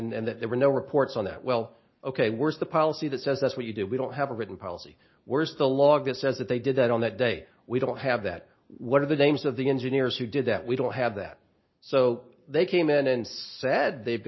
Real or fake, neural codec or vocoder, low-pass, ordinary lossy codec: real; none; 7.2 kHz; MP3, 24 kbps